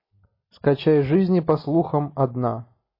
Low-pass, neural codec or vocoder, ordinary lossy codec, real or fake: 5.4 kHz; none; MP3, 24 kbps; real